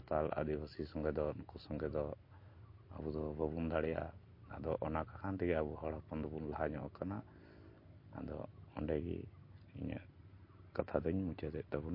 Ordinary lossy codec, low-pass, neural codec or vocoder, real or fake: MP3, 32 kbps; 5.4 kHz; none; real